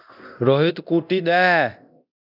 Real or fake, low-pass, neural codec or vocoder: fake; 5.4 kHz; codec, 24 kHz, 0.9 kbps, DualCodec